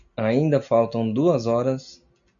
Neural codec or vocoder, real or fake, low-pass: none; real; 7.2 kHz